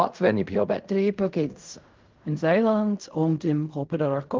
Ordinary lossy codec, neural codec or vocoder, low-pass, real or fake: Opus, 24 kbps; codec, 16 kHz in and 24 kHz out, 0.4 kbps, LongCat-Audio-Codec, fine tuned four codebook decoder; 7.2 kHz; fake